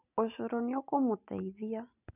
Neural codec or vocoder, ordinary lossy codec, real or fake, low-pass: none; none; real; 3.6 kHz